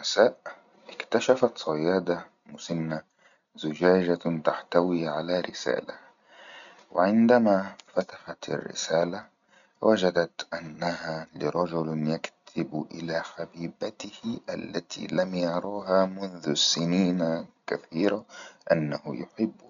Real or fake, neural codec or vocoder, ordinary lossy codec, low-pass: real; none; none; 7.2 kHz